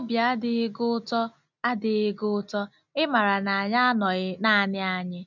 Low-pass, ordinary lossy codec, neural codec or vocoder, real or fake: 7.2 kHz; none; none; real